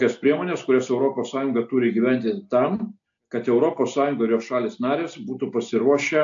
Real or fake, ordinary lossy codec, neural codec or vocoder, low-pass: real; AAC, 64 kbps; none; 7.2 kHz